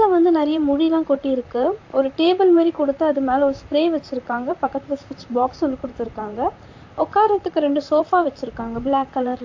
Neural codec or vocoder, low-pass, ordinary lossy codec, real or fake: vocoder, 44.1 kHz, 128 mel bands, Pupu-Vocoder; 7.2 kHz; AAC, 48 kbps; fake